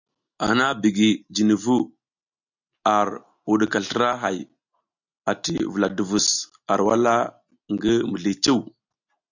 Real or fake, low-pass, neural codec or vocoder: real; 7.2 kHz; none